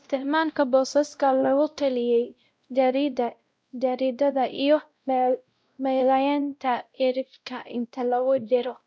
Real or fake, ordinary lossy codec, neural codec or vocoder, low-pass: fake; none; codec, 16 kHz, 0.5 kbps, X-Codec, WavLM features, trained on Multilingual LibriSpeech; none